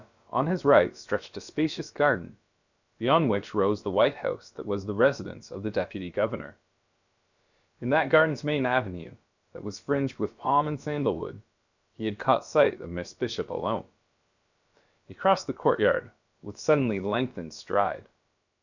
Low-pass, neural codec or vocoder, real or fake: 7.2 kHz; codec, 16 kHz, about 1 kbps, DyCAST, with the encoder's durations; fake